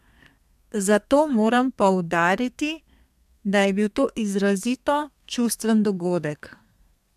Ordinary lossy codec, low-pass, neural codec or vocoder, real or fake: MP3, 96 kbps; 14.4 kHz; codec, 32 kHz, 1.9 kbps, SNAC; fake